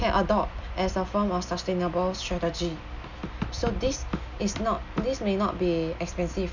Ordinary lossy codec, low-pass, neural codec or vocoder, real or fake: none; 7.2 kHz; none; real